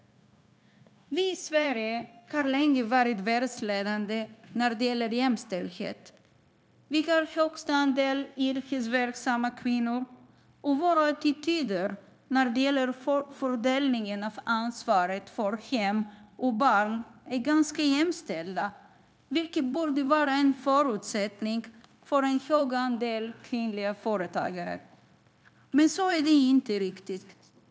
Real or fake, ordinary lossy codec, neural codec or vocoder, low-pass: fake; none; codec, 16 kHz, 0.9 kbps, LongCat-Audio-Codec; none